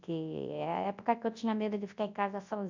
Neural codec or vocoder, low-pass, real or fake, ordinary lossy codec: codec, 24 kHz, 0.9 kbps, WavTokenizer, large speech release; 7.2 kHz; fake; MP3, 64 kbps